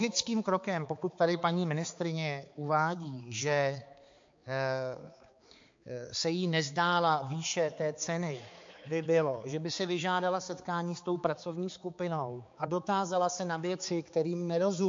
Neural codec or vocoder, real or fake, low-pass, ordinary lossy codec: codec, 16 kHz, 4 kbps, X-Codec, HuBERT features, trained on balanced general audio; fake; 7.2 kHz; MP3, 48 kbps